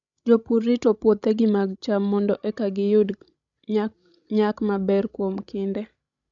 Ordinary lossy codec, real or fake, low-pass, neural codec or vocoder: none; fake; 7.2 kHz; codec, 16 kHz, 16 kbps, FreqCodec, larger model